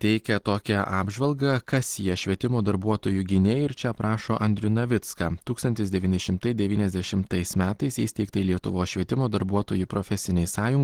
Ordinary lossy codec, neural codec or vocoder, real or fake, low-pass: Opus, 16 kbps; none; real; 19.8 kHz